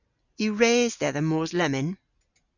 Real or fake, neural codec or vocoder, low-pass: real; none; 7.2 kHz